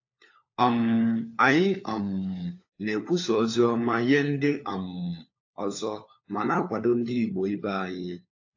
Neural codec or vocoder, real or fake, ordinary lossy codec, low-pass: codec, 16 kHz, 4 kbps, FunCodec, trained on LibriTTS, 50 frames a second; fake; AAC, 48 kbps; 7.2 kHz